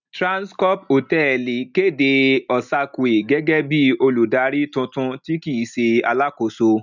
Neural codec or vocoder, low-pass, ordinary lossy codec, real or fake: none; 7.2 kHz; none; real